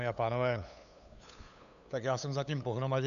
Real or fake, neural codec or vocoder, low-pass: fake; codec, 16 kHz, 8 kbps, FunCodec, trained on LibriTTS, 25 frames a second; 7.2 kHz